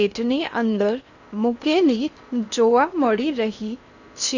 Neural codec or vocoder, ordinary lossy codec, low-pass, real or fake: codec, 16 kHz in and 24 kHz out, 0.8 kbps, FocalCodec, streaming, 65536 codes; none; 7.2 kHz; fake